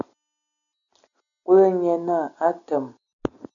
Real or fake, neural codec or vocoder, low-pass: real; none; 7.2 kHz